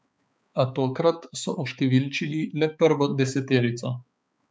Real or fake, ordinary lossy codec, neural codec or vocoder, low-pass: fake; none; codec, 16 kHz, 4 kbps, X-Codec, HuBERT features, trained on balanced general audio; none